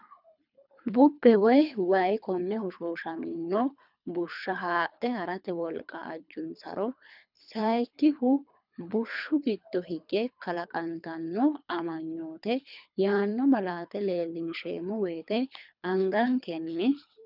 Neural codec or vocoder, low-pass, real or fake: codec, 24 kHz, 3 kbps, HILCodec; 5.4 kHz; fake